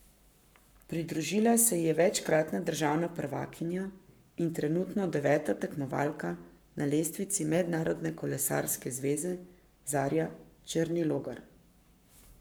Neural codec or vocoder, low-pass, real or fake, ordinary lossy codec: codec, 44.1 kHz, 7.8 kbps, Pupu-Codec; none; fake; none